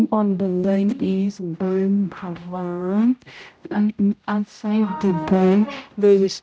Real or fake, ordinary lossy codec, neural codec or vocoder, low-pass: fake; none; codec, 16 kHz, 0.5 kbps, X-Codec, HuBERT features, trained on general audio; none